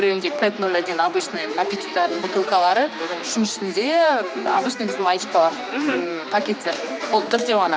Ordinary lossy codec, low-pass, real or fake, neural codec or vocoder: none; none; fake; codec, 16 kHz, 2 kbps, X-Codec, HuBERT features, trained on general audio